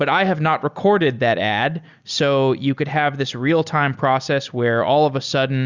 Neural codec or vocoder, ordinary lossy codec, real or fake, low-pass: none; Opus, 64 kbps; real; 7.2 kHz